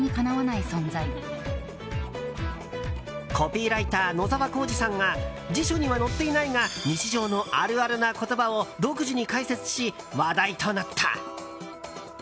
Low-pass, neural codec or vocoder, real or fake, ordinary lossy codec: none; none; real; none